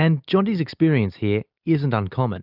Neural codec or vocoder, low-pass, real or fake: none; 5.4 kHz; real